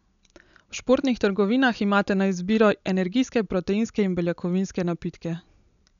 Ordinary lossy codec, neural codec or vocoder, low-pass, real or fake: none; none; 7.2 kHz; real